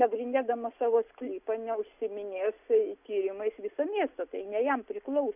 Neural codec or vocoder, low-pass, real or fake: none; 3.6 kHz; real